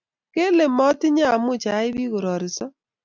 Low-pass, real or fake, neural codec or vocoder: 7.2 kHz; real; none